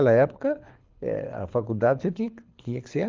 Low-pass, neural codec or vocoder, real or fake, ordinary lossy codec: 7.2 kHz; codec, 24 kHz, 6 kbps, HILCodec; fake; Opus, 32 kbps